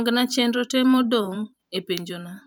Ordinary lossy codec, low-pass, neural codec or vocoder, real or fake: none; none; vocoder, 44.1 kHz, 128 mel bands every 512 samples, BigVGAN v2; fake